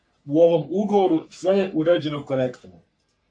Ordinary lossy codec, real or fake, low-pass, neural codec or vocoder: AAC, 64 kbps; fake; 9.9 kHz; codec, 44.1 kHz, 3.4 kbps, Pupu-Codec